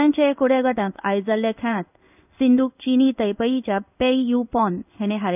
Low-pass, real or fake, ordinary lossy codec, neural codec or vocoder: 3.6 kHz; fake; none; codec, 16 kHz in and 24 kHz out, 1 kbps, XY-Tokenizer